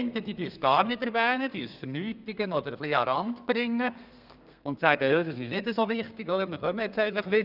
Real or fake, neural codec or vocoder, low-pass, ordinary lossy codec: fake; codec, 32 kHz, 1.9 kbps, SNAC; 5.4 kHz; none